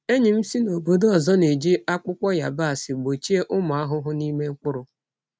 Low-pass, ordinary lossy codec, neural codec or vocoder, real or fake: none; none; none; real